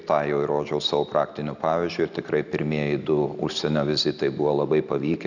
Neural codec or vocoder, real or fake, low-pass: none; real; 7.2 kHz